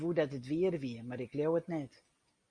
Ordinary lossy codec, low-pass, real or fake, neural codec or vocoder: Opus, 64 kbps; 9.9 kHz; real; none